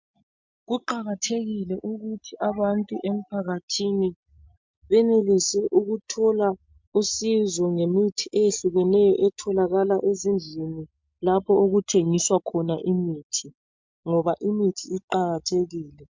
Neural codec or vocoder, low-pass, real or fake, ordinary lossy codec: none; 7.2 kHz; real; MP3, 64 kbps